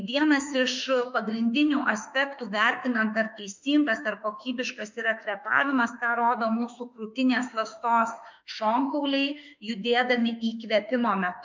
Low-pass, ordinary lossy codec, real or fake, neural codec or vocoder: 7.2 kHz; MP3, 64 kbps; fake; autoencoder, 48 kHz, 32 numbers a frame, DAC-VAE, trained on Japanese speech